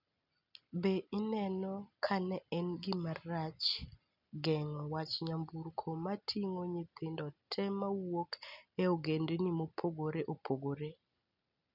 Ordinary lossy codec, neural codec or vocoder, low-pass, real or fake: none; none; 5.4 kHz; real